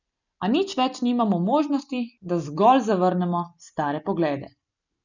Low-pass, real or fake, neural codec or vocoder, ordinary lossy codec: 7.2 kHz; real; none; none